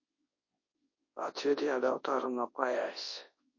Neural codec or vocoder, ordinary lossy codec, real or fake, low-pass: codec, 24 kHz, 0.9 kbps, WavTokenizer, large speech release; MP3, 32 kbps; fake; 7.2 kHz